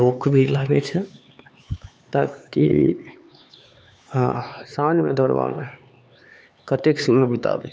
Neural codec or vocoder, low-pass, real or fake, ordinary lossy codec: codec, 16 kHz, 4 kbps, X-Codec, HuBERT features, trained on LibriSpeech; none; fake; none